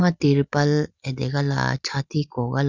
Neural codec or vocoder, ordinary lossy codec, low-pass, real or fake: none; none; 7.2 kHz; real